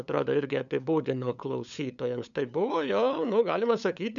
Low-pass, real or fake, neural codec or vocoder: 7.2 kHz; fake; codec, 16 kHz, 8 kbps, FunCodec, trained on LibriTTS, 25 frames a second